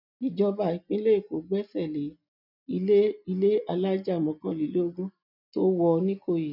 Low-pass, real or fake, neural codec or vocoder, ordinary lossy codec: 5.4 kHz; real; none; none